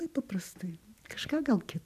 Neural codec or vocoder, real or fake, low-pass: vocoder, 44.1 kHz, 128 mel bands every 256 samples, BigVGAN v2; fake; 14.4 kHz